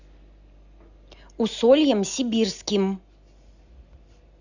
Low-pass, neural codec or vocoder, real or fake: 7.2 kHz; none; real